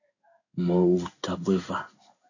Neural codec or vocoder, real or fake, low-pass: codec, 16 kHz in and 24 kHz out, 1 kbps, XY-Tokenizer; fake; 7.2 kHz